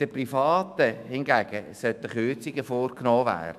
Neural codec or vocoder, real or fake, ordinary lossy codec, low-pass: autoencoder, 48 kHz, 128 numbers a frame, DAC-VAE, trained on Japanese speech; fake; none; 14.4 kHz